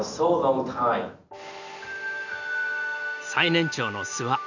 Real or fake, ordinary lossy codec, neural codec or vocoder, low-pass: real; none; none; 7.2 kHz